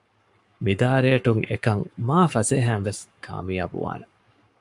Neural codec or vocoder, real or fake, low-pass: codec, 44.1 kHz, 7.8 kbps, Pupu-Codec; fake; 10.8 kHz